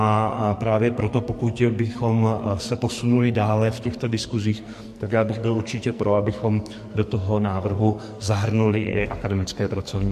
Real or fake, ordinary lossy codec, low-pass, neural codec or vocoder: fake; MP3, 64 kbps; 14.4 kHz; codec, 44.1 kHz, 2.6 kbps, SNAC